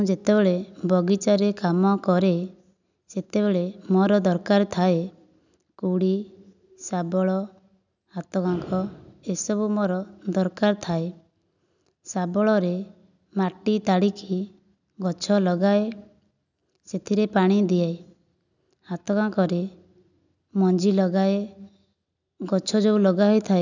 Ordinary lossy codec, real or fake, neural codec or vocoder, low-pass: none; real; none; 7.2 kHz